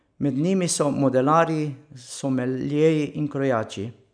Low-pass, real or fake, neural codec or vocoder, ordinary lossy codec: 9.9 kHz; real; none; none